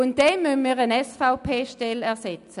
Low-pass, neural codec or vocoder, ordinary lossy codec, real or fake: 10.8 kHz; none; none; real